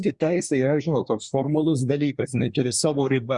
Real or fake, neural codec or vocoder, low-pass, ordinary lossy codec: fake; codec, 24 kHz, 1 kbps, SNAC; 10.8 kHz; Opus, 64 kbps